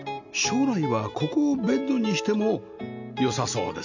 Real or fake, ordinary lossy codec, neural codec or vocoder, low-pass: real; none; none; 7.2 kHz